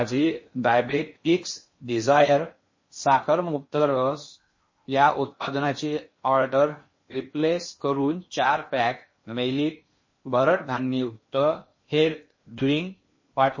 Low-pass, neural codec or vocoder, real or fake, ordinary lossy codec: 7.2 kHz; codec, 16 kHz in and 24 kHz out, 0.6 kbps, FocalCodec, streaming, 2048 codes; fake; MP3, 32 kbps